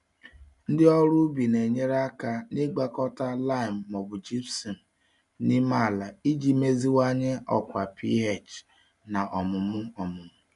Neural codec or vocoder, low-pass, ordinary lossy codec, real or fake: none; 10.8 kHz; none; real